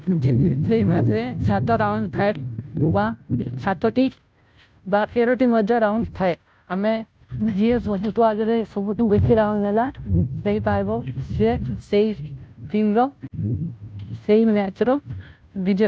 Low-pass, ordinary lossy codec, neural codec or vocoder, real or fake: none; none; codec, 16 kHz, 0.5 kbps, FunCodec, trained on Chinese and English, 25 frames a second; fake